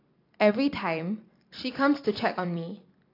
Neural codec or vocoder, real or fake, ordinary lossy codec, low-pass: none; real; AAC, 32 kbps; 5.4 kHz